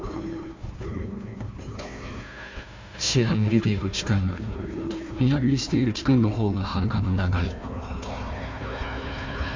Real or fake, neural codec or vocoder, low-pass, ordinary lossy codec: fake; codec, 16 kHz, 1 kbps, FunCodec, trained on Chinese and English, 50 frames a second; 7.2 kHz; MP3, 48 kbps